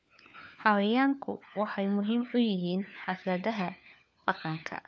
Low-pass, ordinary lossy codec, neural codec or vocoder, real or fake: none; none; codec, 16 kHz, 4 kbps, FunCodec, trained on LibriTTS, 50 frames a second; fake